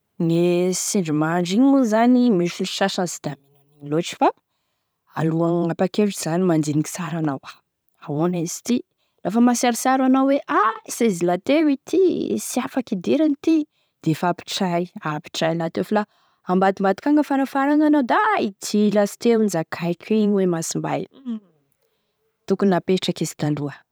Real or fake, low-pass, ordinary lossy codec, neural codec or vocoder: fake; none; none; vocoder, 44.1 kHz, 128 mel bands every 512 samples, BigVGAN v2